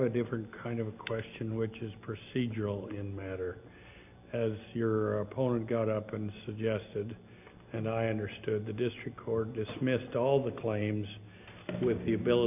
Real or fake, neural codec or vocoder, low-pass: real; none; 3.6 kHz